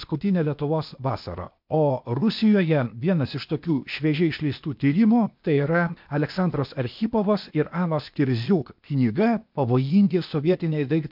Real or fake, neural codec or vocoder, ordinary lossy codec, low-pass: fake; codec, 16 kHz, 0.8 kbps, ZipCodec; MP3, 48 kbps; 5.4 kHz